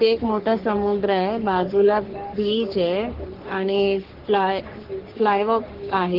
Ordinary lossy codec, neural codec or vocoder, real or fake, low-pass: Opus, 16 kbps; codec, 44.1 kHz, 3.4 kbps, Pupu-Codec; fake; 5.4 kHz